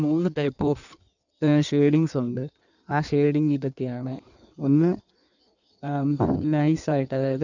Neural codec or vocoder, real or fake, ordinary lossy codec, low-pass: codec, 16 kHz in and 24 kHz out, 1.1 kbps, FireRedTTS-2 codec; fake; none; 7.2 kHz